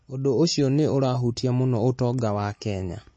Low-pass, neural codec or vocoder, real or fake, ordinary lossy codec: 9.9 kHz; none; real; MP3, 32 kbps